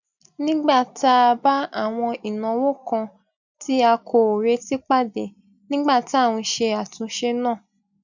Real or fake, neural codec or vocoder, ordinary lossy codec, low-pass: real; none; none; 7.2 kHz